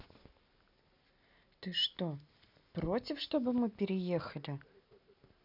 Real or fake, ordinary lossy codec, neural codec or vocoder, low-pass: real; none; none; 5.4 kHz